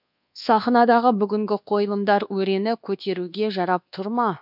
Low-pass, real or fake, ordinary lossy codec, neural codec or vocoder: 5.4 kHz; fake; none; codec, 24 kHz, 1.2 kbps, DualCodec